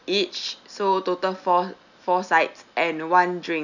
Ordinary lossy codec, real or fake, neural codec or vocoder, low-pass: none; real; none; 7.2 kHz